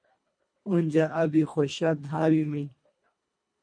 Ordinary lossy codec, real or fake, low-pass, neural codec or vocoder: MP3, 48 kbps; fake; 9.9 kHz; codec, 24 kHz, 1.5 kbps, HILCodec